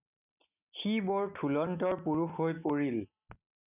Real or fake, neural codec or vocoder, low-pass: real; none; 3.6 kHz